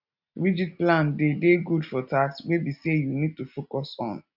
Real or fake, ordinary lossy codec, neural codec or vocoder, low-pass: real; none; none; 5.4 kHz